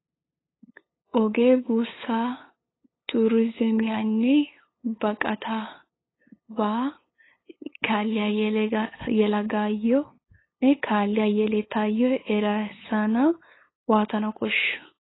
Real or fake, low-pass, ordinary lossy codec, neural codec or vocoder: fake; 7.2 kHz; AAC, 16 kbps; codec, 16 kHz, 8 kbps, FunCodec, trained on LibriTTS, 25 frames a second